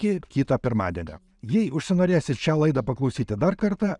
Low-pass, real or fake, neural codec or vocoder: 10.8 kHz; real; none